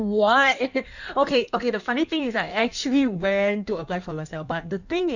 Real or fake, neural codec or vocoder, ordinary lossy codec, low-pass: fake; codec, 16 kHz in and 24 kHz out, 1.1 kbps, FireRedTTS-2 codec; none; 7.2 kHz